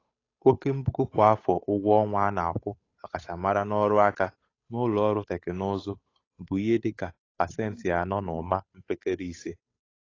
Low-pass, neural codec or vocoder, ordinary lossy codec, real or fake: 7.2 kHz; codec, 16 kHz, 8 kbps, FunCodec, trained on Chinese and English, 25 frames a second; AAC, 32 kbps; fake